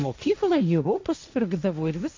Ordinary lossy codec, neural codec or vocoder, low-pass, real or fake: MP3, 48 kbps; codec, 16 kHz, 1.1 kbps, Voila-Tokenizer; 7.2 kHz; fake